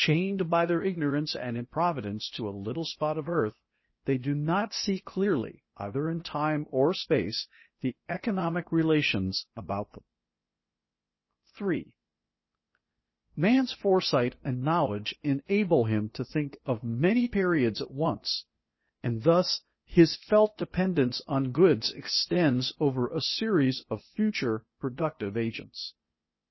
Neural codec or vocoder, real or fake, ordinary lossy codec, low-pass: codec, 16 kHz, 0.8 kbps, ZipCodec; fake; MP3, 24 kbps; 7.2 kHz